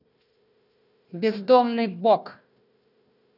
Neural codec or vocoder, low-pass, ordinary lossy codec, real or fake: codec, 16 kHz, 1 kbps, FunCodec, trained on Chinese and English, 50 frames a second; 5.4 kHz; none; fake